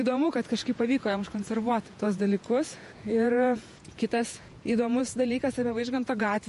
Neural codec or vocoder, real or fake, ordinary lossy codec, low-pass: vocoder, 48 kHz, 128 mel bands, Vocos; fake; MP3, 48 kbps; 14.4 kHz